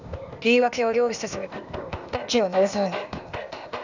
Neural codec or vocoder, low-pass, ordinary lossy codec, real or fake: codec, 16 kHz, 0.8 kbps, ZipCodec; 7.2 kHz; none; fake